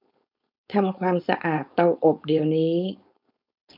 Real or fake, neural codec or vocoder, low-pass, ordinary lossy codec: fake; codec, 16 kHz, 4.8 kbps, FACodec; 5.4 kHz; none